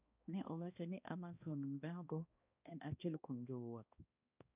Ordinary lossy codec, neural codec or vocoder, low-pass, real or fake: none; codec, 16 kHz, 1 kbps, X-Codec, HuBERT features, trained on balanced general audio; 3.6 kHz; fake